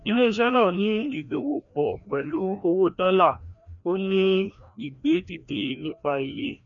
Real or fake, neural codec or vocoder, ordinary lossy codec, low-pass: fake; codec, 16 kHz, 1 kbps, FreqCodec, larger model; none; 7.2 kHz